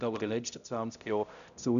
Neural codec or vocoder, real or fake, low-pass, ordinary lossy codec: codec, 16 kHz, 0.5 kbps, X-Codec, HuBERT features, trained on balanced general audio; fake; 7.2 kHz; none